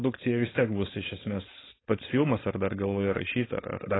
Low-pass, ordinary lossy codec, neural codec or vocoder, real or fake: 7.2 kHz; AAC, 16 kbps; codec, 16 kHz, 4.8 kbps, FACodec; fake